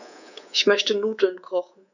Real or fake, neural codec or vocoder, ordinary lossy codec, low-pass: fake; codec, 24 kHz, 3.1 kbps, DualCodec; none; 7.2 kHz